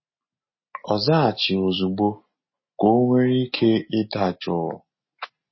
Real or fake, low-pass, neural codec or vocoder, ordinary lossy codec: real; 7.2 kHz; none; MP3, 24 kbps